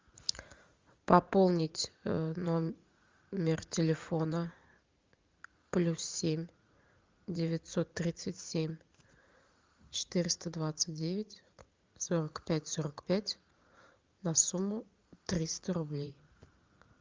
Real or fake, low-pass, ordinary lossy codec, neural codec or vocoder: fake; 7.2 kHz; Opus, 32 kbps; vocoder, 44.1 kHz, 80 mel bands, Vocos